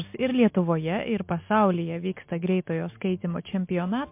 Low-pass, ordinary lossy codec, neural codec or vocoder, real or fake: 3.6 kHz; MP3, 32 kbps; none; real